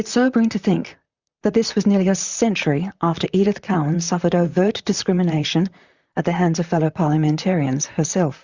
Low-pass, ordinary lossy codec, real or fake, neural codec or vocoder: 7.2 kHz; Opus, 64 kbps; fake; vocoder, 44.1 kHz, 128 mel bands, Pupu-Vocoder